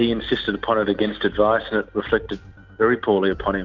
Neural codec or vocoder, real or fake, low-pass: none; real; 7.2 kHz